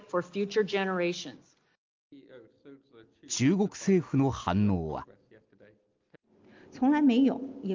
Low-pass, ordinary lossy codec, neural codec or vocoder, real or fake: 7.2 kHz; Opus, 32 kbps; none; real